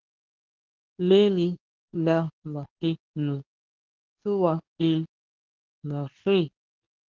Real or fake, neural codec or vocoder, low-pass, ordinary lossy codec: fake; codec, 24 kHz, 0.9 kbps, WavTokenizer, medium speech release version 2; 7.2 kHz; Opus, 32 kbps